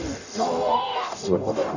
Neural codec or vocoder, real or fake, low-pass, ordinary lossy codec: codec, 44.1 kHz, 0.9 kbps, DAC; fake; 7.2 kHz; none